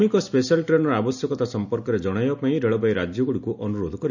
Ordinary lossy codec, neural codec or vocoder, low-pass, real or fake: none; none; 7.2 kHz; real